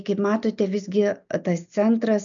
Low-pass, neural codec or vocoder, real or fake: 7.2 kHz; none; real